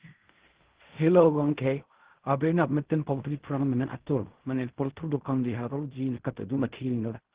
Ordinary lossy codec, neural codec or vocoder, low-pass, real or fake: Opus, 16 kbps; codec, 16 kHz in and 24 kHz out, 0.4 kbps, LongCat-Audio-Codec, fine tuned four codebook decoder; 3.6 kHz; fake